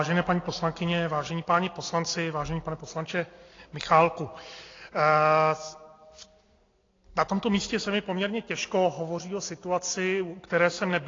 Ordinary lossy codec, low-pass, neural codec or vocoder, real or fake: AAC, 32 kbps; 7.2 kHz; none; real